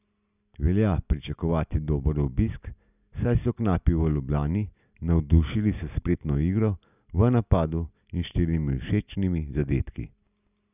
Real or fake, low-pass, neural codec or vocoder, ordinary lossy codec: real; 3.6 kHz; none; none